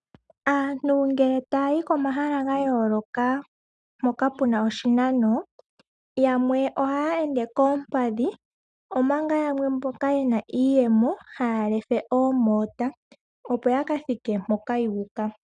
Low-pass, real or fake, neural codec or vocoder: 9.9 kHz; real; none